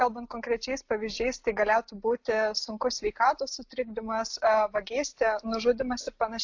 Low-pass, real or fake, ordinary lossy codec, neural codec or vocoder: 7.2 kHz; real; AAC, 48 kbps; none